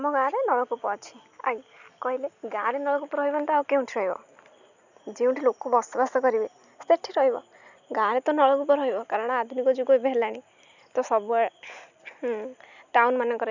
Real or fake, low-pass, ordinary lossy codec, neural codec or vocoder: real; 7.2 kHz; none; none